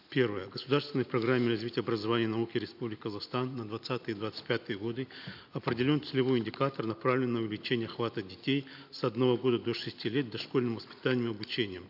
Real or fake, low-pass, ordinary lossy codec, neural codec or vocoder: real; 5.4 kHz; none; none